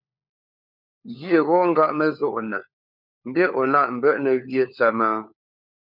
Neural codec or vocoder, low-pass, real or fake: codec, 16 kHz, 4 kbps, FunCodec, trained on LibriTTS, 50 frames a second; 5.4 kHz; fake